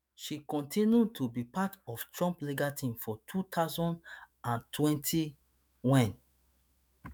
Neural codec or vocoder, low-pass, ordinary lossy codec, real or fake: autoencoder, 48 kHz, 128 numbers a frame, DAC-VAE, trained on Japanese speech; none; none; fake